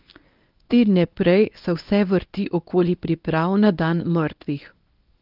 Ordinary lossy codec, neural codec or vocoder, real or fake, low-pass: Opus, 32 kbps; codec, 24 kHz, 0.9 kbps, WavTokenizer, medium speech release version 1; fake; 5.4 kHz